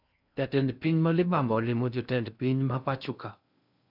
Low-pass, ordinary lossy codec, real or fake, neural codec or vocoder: 5.4 kHz; none; fake; codec, 16 kHz in and 24 kHz out, 0.6 kbps, FocalCodec, streaming, 2048 codes